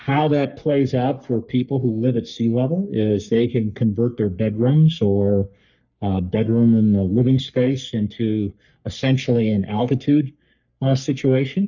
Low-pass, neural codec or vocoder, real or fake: 7.2 kHz; codec, 44.1 kHz, 3.4 kbps, Pupu-Codec; fake